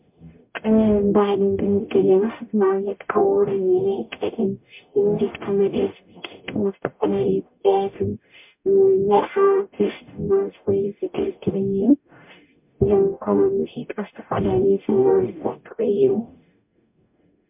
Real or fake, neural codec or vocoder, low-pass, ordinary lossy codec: fake; codec, 44.1 kHz, 0.9 kbps, DAC; 3.6 kHz; MP3, 32 kbps